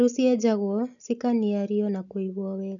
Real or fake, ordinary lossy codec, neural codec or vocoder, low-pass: real; none; none; 7.2 kHz